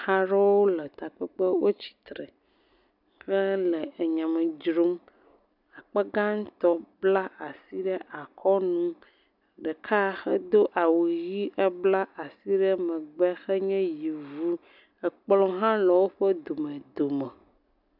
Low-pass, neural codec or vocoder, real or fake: 5.4 kHz; none; real